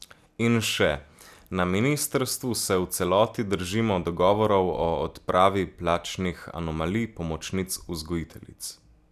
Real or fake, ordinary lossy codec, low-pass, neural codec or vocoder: real; none; 14.4 kHz; none